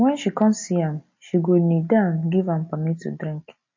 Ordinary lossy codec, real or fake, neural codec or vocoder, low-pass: MP3, 32 kbps; real; none; 7.2 kHz